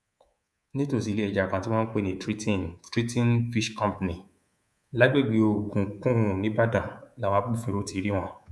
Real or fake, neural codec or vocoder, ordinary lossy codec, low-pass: fake; codec, 24 kHz, 3.1 kbps, DualCodec; none; none